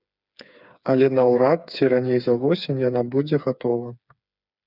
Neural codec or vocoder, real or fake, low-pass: codec, 16 kHz, 4 kbps, FreqCodec, smaller model; fake; 5.4 kHz